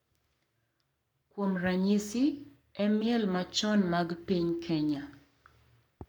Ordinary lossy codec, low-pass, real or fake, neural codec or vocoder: none; 19.8 kHz; fake; codec, 44.1 kHz, 7.8 kbps, Pupu-Codec